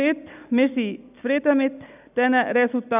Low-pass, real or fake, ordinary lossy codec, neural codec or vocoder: 3.6 kHz; real; none; none